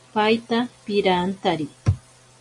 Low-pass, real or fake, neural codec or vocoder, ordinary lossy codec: 10.8 kHz; real; none; MP3, 48 kbps